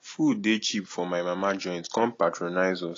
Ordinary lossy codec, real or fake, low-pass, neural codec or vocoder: AAC, 32 kbps; real; 7.2 kHz; none